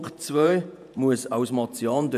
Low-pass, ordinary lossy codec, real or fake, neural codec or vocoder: 14.4 kHz; none; fake; vocoder, 48 kHz, 128 mel bands, Vocos